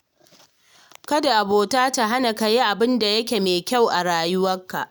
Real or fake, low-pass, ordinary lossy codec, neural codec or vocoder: real; none; none; none